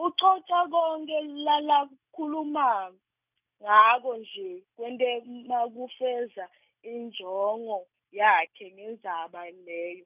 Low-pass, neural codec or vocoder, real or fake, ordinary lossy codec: 3.6 kHz; none; real; none